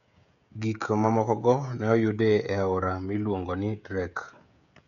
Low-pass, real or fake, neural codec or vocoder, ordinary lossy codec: 7.2 kHz; fake; codec, 16 kHz, 16 kbps, FreqCodec, smaller model; none